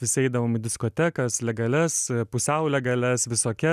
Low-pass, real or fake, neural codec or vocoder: 14.4 kHz; real; none